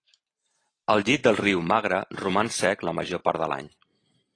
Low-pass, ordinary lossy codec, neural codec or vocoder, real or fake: 9.9 kHz; AAC, 32 kbps; vocoder, 44.1 kHz, 128 mel bands every 512 samples, BigVGAN v2; fake